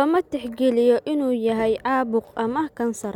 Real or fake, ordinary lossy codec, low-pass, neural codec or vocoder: real; none; 19.8 kHz; none